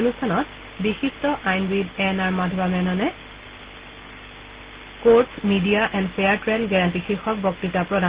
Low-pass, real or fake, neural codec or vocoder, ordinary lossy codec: 3.6 kHz; real; none; Opus, 16 kbps